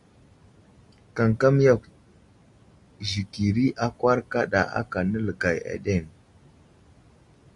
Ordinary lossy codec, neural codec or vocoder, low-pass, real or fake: AAC, 64 kbps; none; 10.8 kHz; real